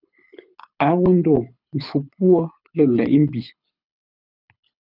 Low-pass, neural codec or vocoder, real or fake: 5.4 kHz; codec, 24 kHz, 6 kbps, HILCodec; fake